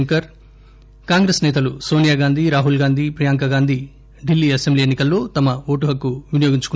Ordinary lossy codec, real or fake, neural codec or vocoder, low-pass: none; real; none; none